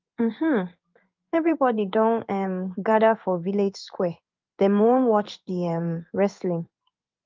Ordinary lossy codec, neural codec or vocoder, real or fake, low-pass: Opus, 24 kbps; codec, 16 kHz in and 24 kHz out, 1 kbps, XY-Tokenizer; fake; 7.2 kHz